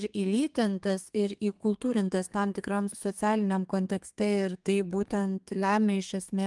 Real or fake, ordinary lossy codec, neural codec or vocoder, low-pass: fake; Opus, 32 kbps; codec, 32 kHz, 1.9 kbps, SNAC; 10.8 kHz